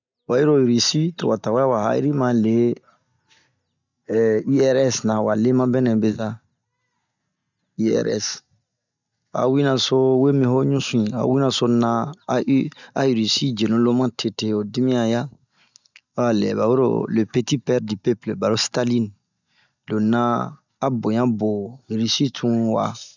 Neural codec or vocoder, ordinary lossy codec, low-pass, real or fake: none; none; 7.2 kHz; real